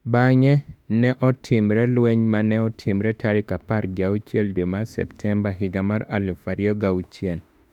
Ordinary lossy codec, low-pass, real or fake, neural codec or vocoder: none; 19.8 kHz; fake; autoencoder, 48 kHz, 32 numbers a frame, DAC-VAE, trained on Japanese speech